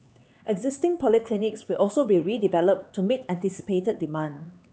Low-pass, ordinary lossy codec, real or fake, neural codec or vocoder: none; none; fake; codec, 16 kHz, 4 kbps, X-Codec, HuBERT features, trained on LibriSpeech